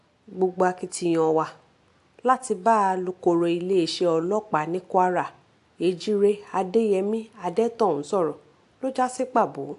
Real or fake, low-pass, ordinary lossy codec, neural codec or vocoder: real; 10.8 kHz; AAC, 64 kbps; none